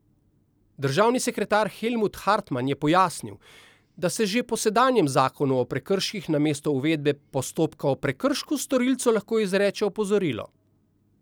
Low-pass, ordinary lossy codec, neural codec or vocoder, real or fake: none; none; none; real